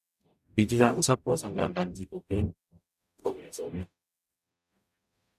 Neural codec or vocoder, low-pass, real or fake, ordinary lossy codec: codec, 44.1 kHz, 0.9 kbps, DAC; 14.4 kHz; fake; none